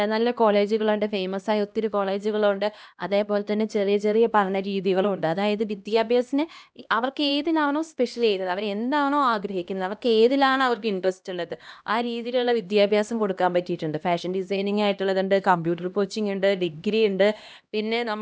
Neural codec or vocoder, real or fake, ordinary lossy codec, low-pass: codec, 16 kHz, 1 kbps, X-Codec, HuBERT features, trained on LibriSpeech; fake; none; none